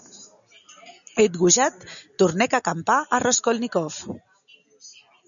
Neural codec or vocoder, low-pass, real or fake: none; 7.2 kHz; real